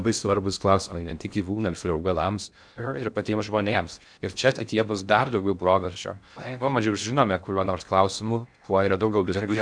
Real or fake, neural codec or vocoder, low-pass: fake; codec, 16 kHz in and 24 kHz out, 0.6 kbps, FocalCodec, streaming, 2048 codes; 9.9 kHz